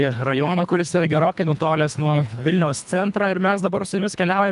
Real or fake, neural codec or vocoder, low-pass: fake; codec, 24 kHz, 1.5 kbps, HILCodec; 10.8 kHz